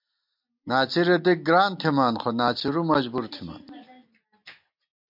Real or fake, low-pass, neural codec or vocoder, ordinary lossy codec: real; 5.4 kHz; none; MP3, 48 kbps